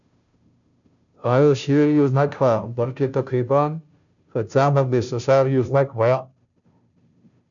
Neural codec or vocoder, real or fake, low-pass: codec, 16 kHz, 0.5 kbps, FunCodec, trained on Chinese and English, 25 frames a second; fake; 7.2 kHz